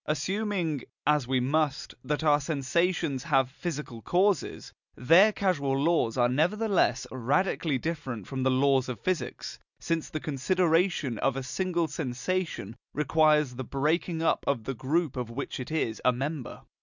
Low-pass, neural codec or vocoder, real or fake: 7.2 kHz; none; real